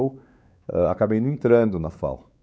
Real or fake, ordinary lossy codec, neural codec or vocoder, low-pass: fake; none; codec, 16 kHz, 4 kbps, X-Codec, WavLM features, trained on Multilingual LibriSpeech; none